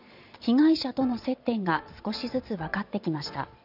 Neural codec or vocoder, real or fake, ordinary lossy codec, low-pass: vocoder, 22.05 kHz, 80 mel bands, Vocos; fake; none; 5.4 kHz